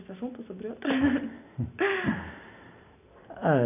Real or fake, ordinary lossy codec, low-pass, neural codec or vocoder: real; none; 3.6 kHz; none